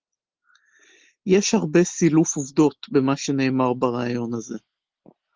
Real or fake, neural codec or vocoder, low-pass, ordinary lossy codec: real; none; 7.2 kHz; Opus, 16 kbps